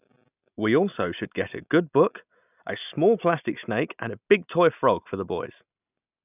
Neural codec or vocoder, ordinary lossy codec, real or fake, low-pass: none; none; real; 3.6 kHz